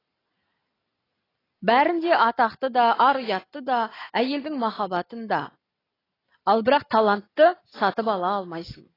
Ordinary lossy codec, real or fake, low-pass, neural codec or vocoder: AAC, 24 kbps; real; 5.4 kHz; none